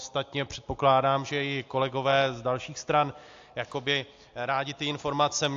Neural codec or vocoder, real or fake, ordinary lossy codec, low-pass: none; real; AAC, 48 kbps; 7.2 kHz